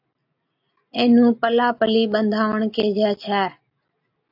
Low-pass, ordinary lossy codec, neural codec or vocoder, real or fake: 5.4 kHz; AAC, 48 kbps; none; real